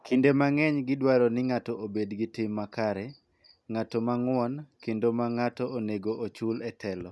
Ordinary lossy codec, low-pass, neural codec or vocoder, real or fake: none; none; none; real